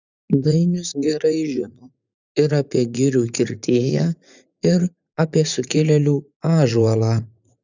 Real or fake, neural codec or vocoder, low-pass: fake; vocoder, 44.1 kHz, 80 mel bands, Vocos; 7.2 kHz